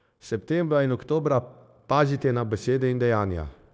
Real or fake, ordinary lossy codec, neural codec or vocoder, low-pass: fake; none; codec, 16 kHz, 0.9 kbps, LongCat-Audio-Codec; none